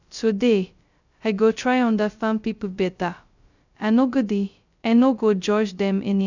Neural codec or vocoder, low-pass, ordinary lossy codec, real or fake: codec, 16 kHz, 0.2 kbps, FocalCodec; 7.2 kHz; none; fake